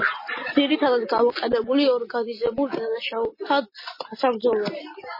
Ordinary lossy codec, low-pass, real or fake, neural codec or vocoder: MP3, 24 kbps; 5.4 kHz; real; none